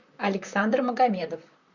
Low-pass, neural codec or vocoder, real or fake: 7.2 kHz; vocoder, 44.1 kHz, 128 mel bands, Pupu-Vocoder; fake